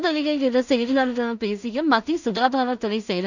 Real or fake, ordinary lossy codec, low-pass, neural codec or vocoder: fake; none; 7.2 kHz; codec, 16 kHz in and 24 kHz out, 0.4 kbps, LongCat-Audio-Codec, two codebook decoder